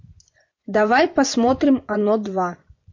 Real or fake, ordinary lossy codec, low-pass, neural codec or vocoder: fake; MP3, 48 kbps; 7.2 kHz; vocoder, 24 kHz, 100 mel bands, Vocos